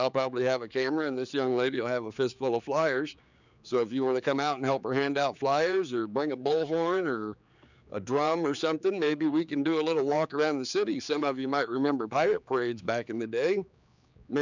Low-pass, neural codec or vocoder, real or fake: 7.2 kHz; codec, 16 kHz, 4 kbps, X-Codec, HuBERT features, trained on general audio; fake